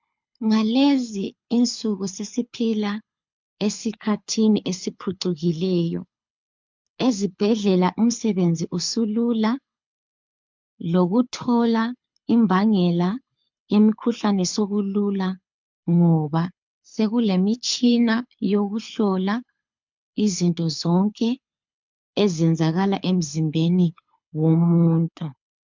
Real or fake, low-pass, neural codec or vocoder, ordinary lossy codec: fake; 7.2 kHz; codec, 24 kHz, 6 kbps, HILCodec; MP3, 64 kbps